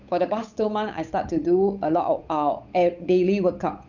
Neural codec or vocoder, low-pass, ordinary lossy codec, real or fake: codec, 16 kHz, 8 kbps, FunCodec, trained on Chinese and English, 25 frames a second; 7.2 kHz; none; fake